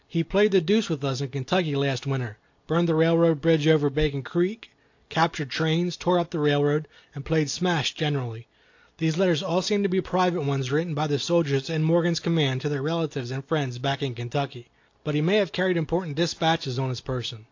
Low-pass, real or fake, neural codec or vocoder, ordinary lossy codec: 7.2 kHz; real; none; AAC, 48 kbps